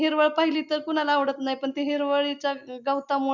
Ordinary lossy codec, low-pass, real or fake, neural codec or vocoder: none; 7.2 kHz; real; none